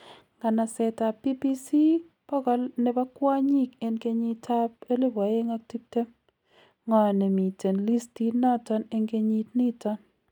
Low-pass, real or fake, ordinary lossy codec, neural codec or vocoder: 19.8 kHz; real; none; none